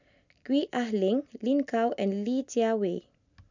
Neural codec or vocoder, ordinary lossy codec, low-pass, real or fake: none; none; 7.2 kHz; real